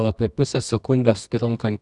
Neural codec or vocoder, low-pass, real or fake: codec, 24 kHz, 0.9 kbps, WavTokenizer, medium music audio release; 10.8 kHz; fake